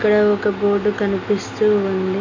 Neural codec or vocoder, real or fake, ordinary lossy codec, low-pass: none; real; AAC, 48 kbps; 7.2 kHz